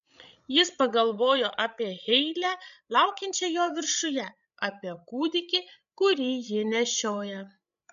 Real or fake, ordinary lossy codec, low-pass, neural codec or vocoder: fake; MP3, 96 kbps; 7.2 kHz; codec, 16 kHz, 16 kbps, FreqCodec, larger model